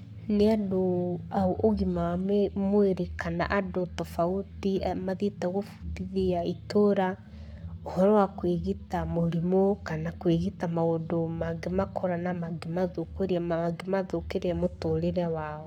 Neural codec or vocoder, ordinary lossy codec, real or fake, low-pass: codec, 44.1 kHz, 7.8 kbps, Pupu-Codec; none; fake; 19.8 kHz